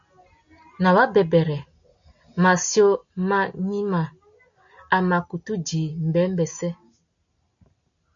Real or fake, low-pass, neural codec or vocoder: real; 7.2 kHz; none